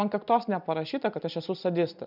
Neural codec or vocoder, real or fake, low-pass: none; real; 5.4 kHz